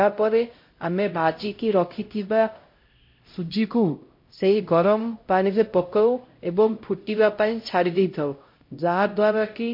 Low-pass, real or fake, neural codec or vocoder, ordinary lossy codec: 5.4 kHz; fake; codec, 16 kHz, 0.5 kbps, X-Codec, HuBERT features, trained on LibriSpeech; MP3, 32 kbps